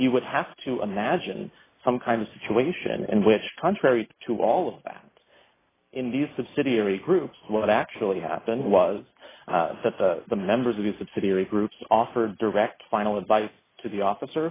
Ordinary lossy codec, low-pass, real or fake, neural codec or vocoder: AAC, 16 kbps; 3.6 kHz; real; none